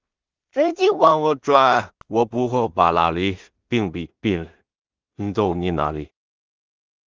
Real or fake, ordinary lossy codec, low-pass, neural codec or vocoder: fake; Opus, 24 kbps; 7.2 kHz; codec, 16 kHz in and 24 kHz out, 0.4 kbps, LongCat-Audio-Codec, two codebook decoder